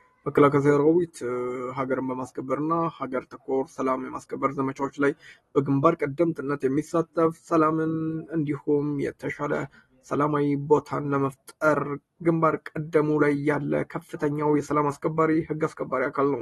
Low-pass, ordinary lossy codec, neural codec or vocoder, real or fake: 19.8 kHz; AAC, 32 kbps; none; real